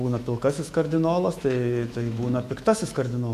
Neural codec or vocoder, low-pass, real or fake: autoencoder, 48 kHz, 128 numbers a frame, DAC-VAE, trained on Japanese speech; 14.4 kHz; fake